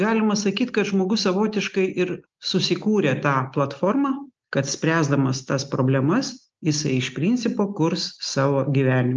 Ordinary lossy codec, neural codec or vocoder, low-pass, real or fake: Opus, 24 kbps; none; 7.2 kHz; real